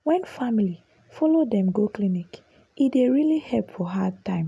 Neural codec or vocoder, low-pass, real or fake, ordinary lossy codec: none; none; real; none